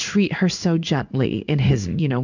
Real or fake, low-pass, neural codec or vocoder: fake; 7.2 kHz; codec, 16 kHz in and 24 kHz out, 1 kbps, XY-Tokenizer